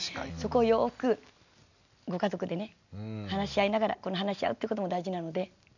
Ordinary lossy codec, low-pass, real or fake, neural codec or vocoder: none; 7.2 kHz; real; none